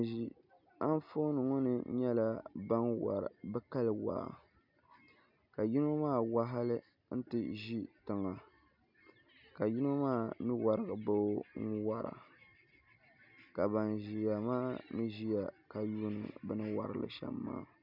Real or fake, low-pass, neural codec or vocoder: real; 5.4 kHz; none